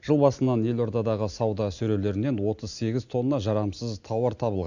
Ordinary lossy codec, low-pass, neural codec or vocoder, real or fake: none; 7.2 kHz; none; real